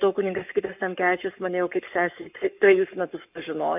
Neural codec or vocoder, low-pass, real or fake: codec, 44.1 kHz, 7.8 kbps, Pupu-Codec; 3.6 kHz; fake